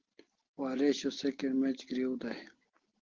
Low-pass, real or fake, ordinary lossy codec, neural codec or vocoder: 7.2 kHz; real; Opus, 16 kbps; none